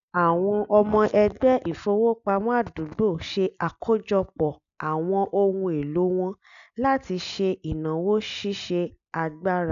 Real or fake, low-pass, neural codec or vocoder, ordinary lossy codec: real; 7.2 kHz; none; none